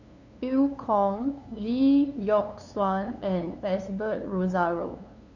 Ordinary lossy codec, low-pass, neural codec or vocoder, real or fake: none; 7.2 kHz; codec, 16 kHz, 2 kbps, FunCodec, trained on LibriTTS, 25 frames a second; fake